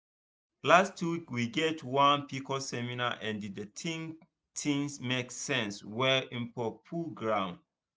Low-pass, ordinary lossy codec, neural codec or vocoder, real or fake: none; none; none; real